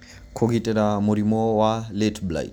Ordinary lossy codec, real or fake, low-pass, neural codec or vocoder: none; real; none; none